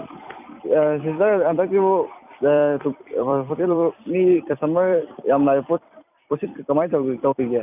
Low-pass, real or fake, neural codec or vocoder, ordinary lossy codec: 3.6 kHz; fake; vocoder, 44.1 kHz, 128 mel bands every 256 samples, BigVGAN v2; none